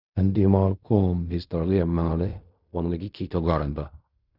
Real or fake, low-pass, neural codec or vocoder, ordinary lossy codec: fake; 5.4 kHz; codec, 16 kHz in and 24 kHz out, 0.4 kbps, LongCat-Audio-Codec, fine tuned four codebook decoder; none